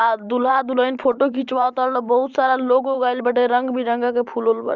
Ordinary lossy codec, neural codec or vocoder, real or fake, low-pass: Opus, 32 kbps; vocoder, 44.1 kHz, 128 mel bands every 512 samples, BigVGAN v2; fake; 7.2 kHz